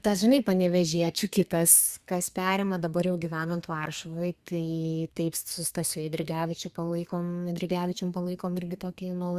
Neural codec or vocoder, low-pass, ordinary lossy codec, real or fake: codec, 32 kHz, 1.9 kbps, SNAC; 14.4 kHz; Opus, 64 kbps; fake